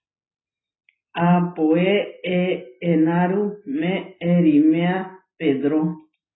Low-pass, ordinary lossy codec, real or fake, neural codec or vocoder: 7.2 kHz; AAC, 16 kbps; real; none